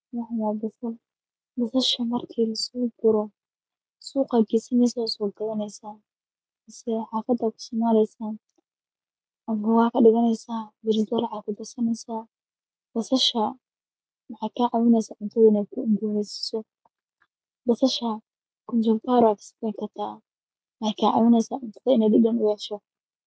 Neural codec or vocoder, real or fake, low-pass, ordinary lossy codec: none; real; none; none